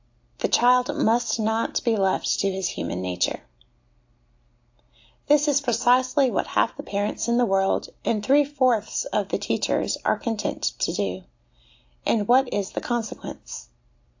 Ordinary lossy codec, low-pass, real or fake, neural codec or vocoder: AAC, 48 kbps; 7.2 kHz; real; none